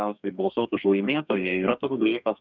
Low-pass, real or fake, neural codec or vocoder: 7.2 kHz; fake; codec, 32 kHz, 1.9 kbps, SNAC